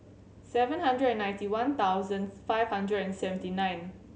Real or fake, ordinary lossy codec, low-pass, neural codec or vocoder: real; none; none; none